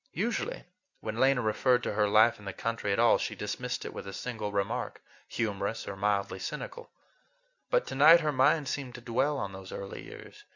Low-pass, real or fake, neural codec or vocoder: 7.2 kHz; real; none